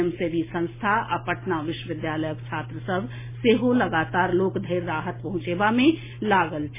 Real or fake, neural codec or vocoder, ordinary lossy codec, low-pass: real; none; MP3, 16 kbps; 3.6 kHz